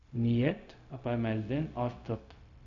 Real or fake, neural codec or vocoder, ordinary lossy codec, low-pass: fake; codec, 16 kHz, 0.4 kbps, LongCat-Audio-Codec; MP3, 96 kbps; 7.2 kHz